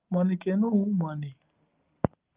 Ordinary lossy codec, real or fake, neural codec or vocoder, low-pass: Opus, 32 kbps; real; none; 3.6 kHz